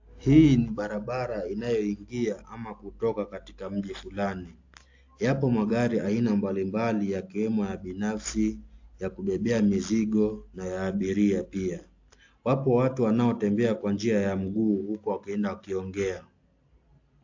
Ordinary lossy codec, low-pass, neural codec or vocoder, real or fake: AAC, 48 kbps; 7.2 kHz; none; real